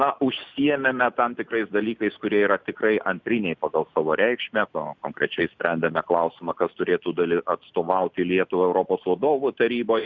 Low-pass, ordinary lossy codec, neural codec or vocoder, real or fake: 7.2 kHz; Opus, 64 kbps; none; real